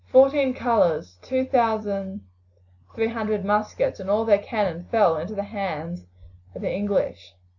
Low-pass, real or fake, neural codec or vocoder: 7.2 kHz; real; none